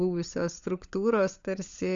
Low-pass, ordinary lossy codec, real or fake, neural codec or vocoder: 7.2 kHz; Opus, 64 kbps; fake; codec, 16 kHz, 16 kbps, FunCodec, trained on LibriTTS, 50 frames a second